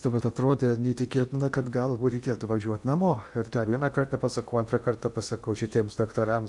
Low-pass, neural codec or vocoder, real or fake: 10.8 kHz; codec, 16 kHz in and 24 kHz out, 0.8 kbps, FocalCodec, streaming, 65536 codes; fake